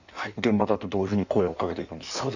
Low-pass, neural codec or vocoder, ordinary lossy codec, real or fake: 7.2 kHz; codec, 16 kHz in and 24 kHz out, 1.1 kbps, FireRedTTS-2 codec; none; fake